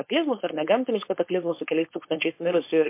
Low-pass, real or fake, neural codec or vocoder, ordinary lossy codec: 3.6 kHz; fake; codec, 16 kHz, 4.8 kbps, FACodec; MP3, 24 kbps